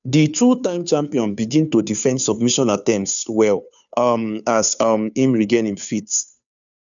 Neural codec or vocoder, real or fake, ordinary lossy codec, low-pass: codec, 16 kHz, 2 kbps, FunCodec, trained on Chinese and English, 25 frames a second; fake; none; 7.2 kHz